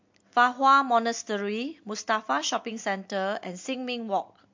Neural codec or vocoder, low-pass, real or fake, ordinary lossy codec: none; 7.2 kHz; real; MP3, 48 kbps